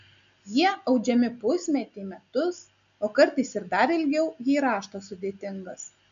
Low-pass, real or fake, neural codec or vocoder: 7.2 kHz; real; none